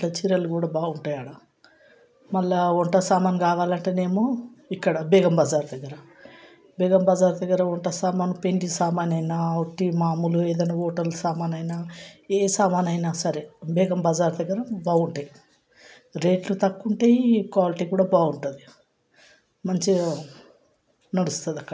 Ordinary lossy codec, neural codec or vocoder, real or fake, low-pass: none; none; real; none